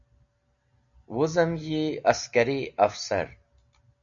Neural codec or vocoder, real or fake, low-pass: none; real; 7.2 kHz